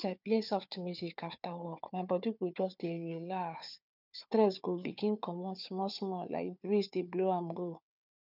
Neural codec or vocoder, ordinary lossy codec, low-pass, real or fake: codec, 16 kHz, 4 kbps, FunCodec, trained on Chinese and English, 50 frames a second; none; 5.4 kHz; fake